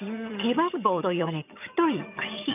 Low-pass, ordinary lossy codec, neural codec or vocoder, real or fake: 3.6 kHz; none; vocoder, 22.05 kHz, 80 mel bands, HiFi-GAN; fake